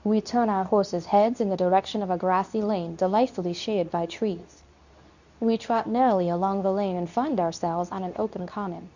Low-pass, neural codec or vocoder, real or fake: 7.2 kHz; codec, 24 kHz, 0.9 kbps, WavTokenizer, medium speech release version 2; fake